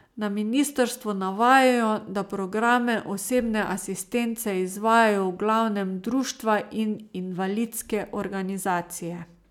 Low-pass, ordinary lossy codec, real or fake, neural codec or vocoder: 19.8 kHz; none; real; none